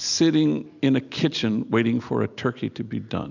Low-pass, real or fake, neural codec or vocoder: 7.2 kHz; real; none